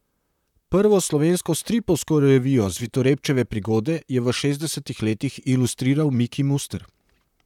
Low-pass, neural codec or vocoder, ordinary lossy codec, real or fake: 19.8 kHz; vocoder, 44.1 kHz, 128 mel bands, Pupu-Vocoder; none; fake